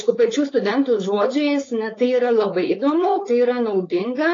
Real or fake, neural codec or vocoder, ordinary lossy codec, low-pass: fake; codec, 16 kHz, 4.8 kbps, FACodec; AAC, 32 kbps; 7.2 kHz